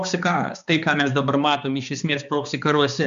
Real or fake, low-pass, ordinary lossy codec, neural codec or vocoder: fake; 7.2 kHz; AAC, 96 kbps; codec, 16 kHz, 2 kbps, X-Codec, HuBERT features, trained on balanced general audio